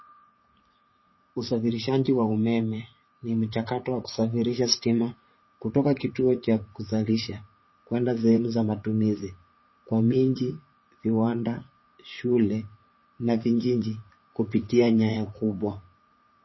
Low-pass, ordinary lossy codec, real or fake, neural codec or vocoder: 7.2 kHz; MP3, 24 kbps; fake; vocoder, 44.1 kHz, 80 mel bands, Vocos